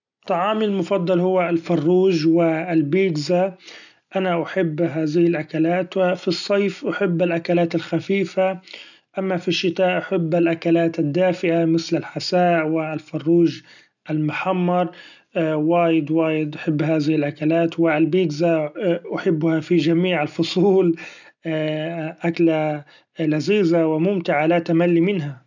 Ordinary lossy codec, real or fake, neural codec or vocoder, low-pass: none; real; none; 7.2 kHz